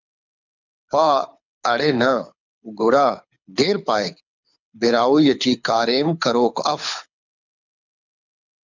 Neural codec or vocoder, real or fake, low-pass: codec, 24 kHz, 6 kbps, HILCodec; fake; 7.2 kHz